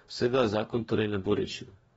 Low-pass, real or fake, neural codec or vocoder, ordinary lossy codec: 19.8 kHz; fake; codec, 44.1 kHz, 2.6 kbps, DAC; AAC, 24 kbps